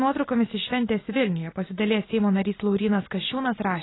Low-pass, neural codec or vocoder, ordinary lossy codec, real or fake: 7.2 kHz; none; AAC, 16 kbps; real